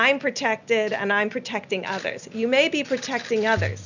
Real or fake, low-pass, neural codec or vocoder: real; 7.2 kHz; none